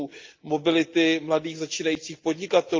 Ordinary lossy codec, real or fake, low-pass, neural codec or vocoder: Opus, 24 kbps; real; 7.2 kHz; none